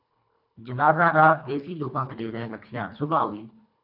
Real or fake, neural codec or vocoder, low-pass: fake; codec, 24 kHz, 1.5 kbps, HILCodec; 5.4 kHz